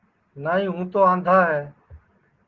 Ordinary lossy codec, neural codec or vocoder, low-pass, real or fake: Opus, 16 kbps; none; 7.2 kHz; real